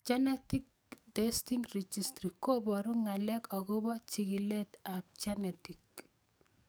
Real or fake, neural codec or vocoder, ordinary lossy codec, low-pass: fake; codec, 44.1 kHz, 7.8 kbps, DAC; none; none